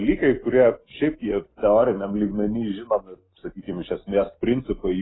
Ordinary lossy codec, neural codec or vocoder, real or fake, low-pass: AAC, 16 kbps; none; real; 7.2 kHz